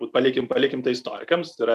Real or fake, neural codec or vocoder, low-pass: real; none; 14.4 kHz